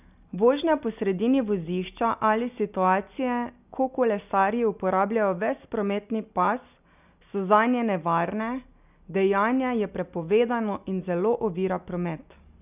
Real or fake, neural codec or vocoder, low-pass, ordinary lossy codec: real; none; 3.6 kHz; none